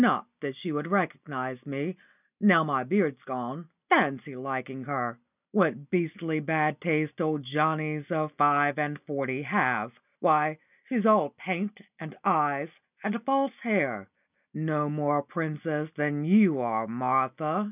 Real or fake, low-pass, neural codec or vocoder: real; 3.6 kHz; none